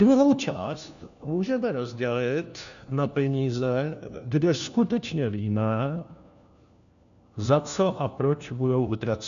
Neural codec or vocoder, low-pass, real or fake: codec, 16 kHz, 1 kbps, FunCodec, trained on LibriTTS, 50 frames a second; 7.2 kHz; fake